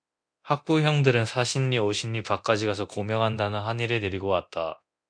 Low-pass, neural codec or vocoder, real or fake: 9.9 kHz; codec, 24 kHz, 0.9 kbps, DualCodec; fake